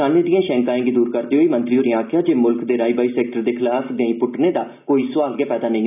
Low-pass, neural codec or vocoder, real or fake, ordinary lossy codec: 3.6 kHz; none; real; none